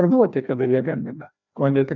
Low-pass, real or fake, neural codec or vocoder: 7.2 kHz; fake; codec, 16 kHz, 1 kbps, FreqCodec, larger model